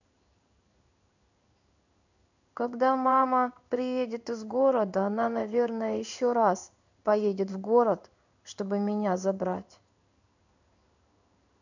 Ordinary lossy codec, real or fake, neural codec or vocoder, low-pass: none; fake; codec, 16 kHz in and 24 kHz out, 1 kbps, XY-Tokenizer; 7.2 kHz